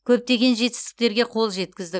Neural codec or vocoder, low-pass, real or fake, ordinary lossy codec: none; none; real; none